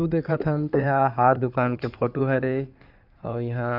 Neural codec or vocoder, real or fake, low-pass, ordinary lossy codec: codec, 16 kHz in and 24 kHz out, 2.2 kbps, FireRedTTS-2 codec; fake; 5.4 kHz; none